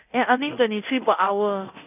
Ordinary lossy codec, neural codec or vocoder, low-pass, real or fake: none; codec, 24 kHz, 0.9 kbps, DualCodec; 3.6 kHz; fake